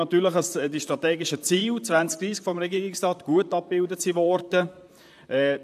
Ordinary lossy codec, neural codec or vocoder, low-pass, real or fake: AAC, 96 kbps; vocoder, 44.1 kHz, 128 mel bands, Pupu-Vocoder; 14.4 kHz; fake